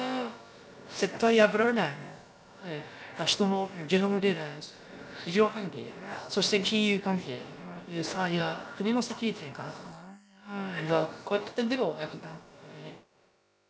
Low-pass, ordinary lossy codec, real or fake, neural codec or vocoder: none; none; fake; codec, 16 kHz, about 1 kbps, DyCAST, with the encoder's durations